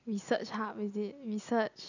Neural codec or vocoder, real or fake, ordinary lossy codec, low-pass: none; real; none; 7.2 kHz